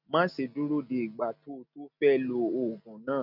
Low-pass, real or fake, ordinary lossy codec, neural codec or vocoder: 5.4 kHz; real; none; none